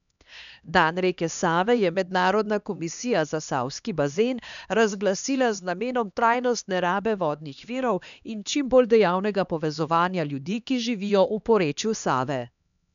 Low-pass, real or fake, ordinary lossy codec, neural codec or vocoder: 7.2 kHz; fake; none; codec, 16 kHz, 2 kbps, X-Codec, HuBERT features, trained on LibriSpeech